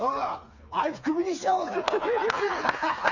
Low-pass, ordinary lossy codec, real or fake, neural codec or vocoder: 7.2 kHz; none; fake; codec, 16 kHz, 4 kbps, FreqCodec, smaller model